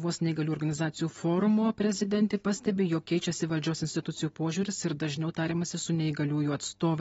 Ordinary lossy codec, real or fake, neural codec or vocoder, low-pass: AAC, 24 kbps; real; none; 10.8 kHz